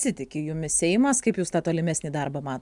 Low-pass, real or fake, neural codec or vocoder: 10.8 kHz; real; none